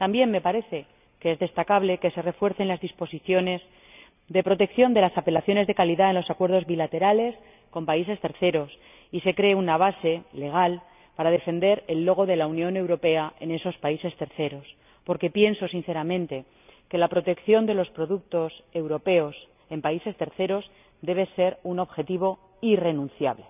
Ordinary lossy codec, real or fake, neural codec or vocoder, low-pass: none; real; none; 3.6 kHz